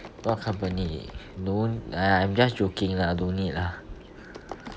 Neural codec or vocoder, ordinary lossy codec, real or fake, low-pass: none; none; real; none